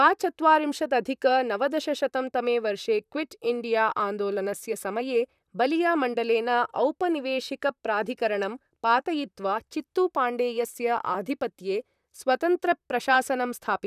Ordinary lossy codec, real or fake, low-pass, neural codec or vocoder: none; fake; 14.4 kHz; vocoder, 44.1 kHz, 128 mel bands, Pupu-Vocoder